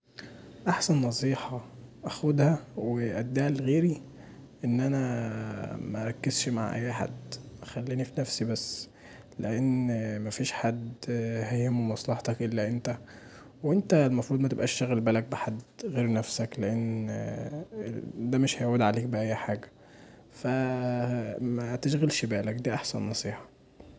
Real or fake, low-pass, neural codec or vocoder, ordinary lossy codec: real; none; none; none